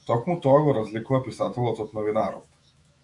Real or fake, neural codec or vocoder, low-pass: fake; autoencoder, 48 kHz, 128 numbers a frame, DAC-VAE, trained on Japanese speech; 10.8 kHz